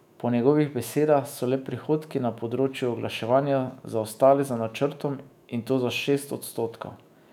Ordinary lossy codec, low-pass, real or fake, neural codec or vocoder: none; 19.8 kHz; fake; autoencoder, 48 kHz, 128 numbers a frame, DAC-VAE, trained on Japanese speech